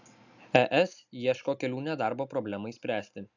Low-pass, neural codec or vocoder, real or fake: 7.2 kHz; none; real